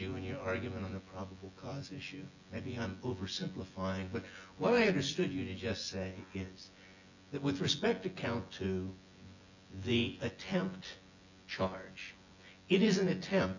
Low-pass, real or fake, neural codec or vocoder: 7.2 kHz; fake; vocoder, 24 kHz, 100 mel bands, Vocos